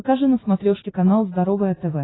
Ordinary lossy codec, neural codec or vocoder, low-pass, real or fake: AAC, 16 kbps; none; 7.2 kHz; real